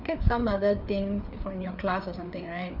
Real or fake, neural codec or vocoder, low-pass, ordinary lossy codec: fake; codec, 16 kHz, 4 kbps, FreqCodec, larger model; 5.4 kHz; none